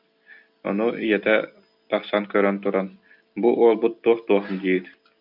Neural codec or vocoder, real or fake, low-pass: none; real; 5.4 kHz